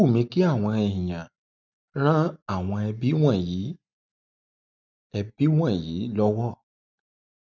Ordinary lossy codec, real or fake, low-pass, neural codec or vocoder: none; real; 7.2 kHz; none